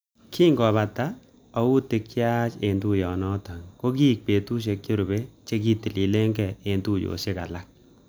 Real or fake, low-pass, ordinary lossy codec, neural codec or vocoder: real; none; none; none